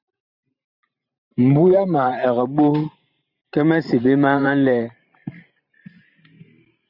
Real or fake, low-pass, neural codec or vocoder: fake; 5.4 kHz; vocoder, 44.1 kHz, 128 mel bands every 512 samples, BigVGAN v2